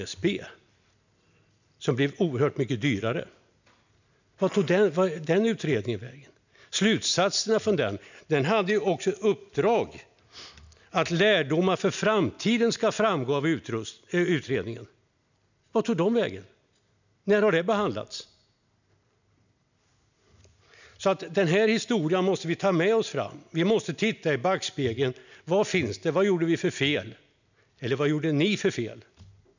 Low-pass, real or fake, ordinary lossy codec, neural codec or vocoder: 7.2 kHz; real; none; none